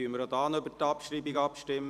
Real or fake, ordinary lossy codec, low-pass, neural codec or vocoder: fake; none; 14.4 kHz; vocoder, 44.1 kHz, 128 mel bands every 512 samples, BigVGAN v2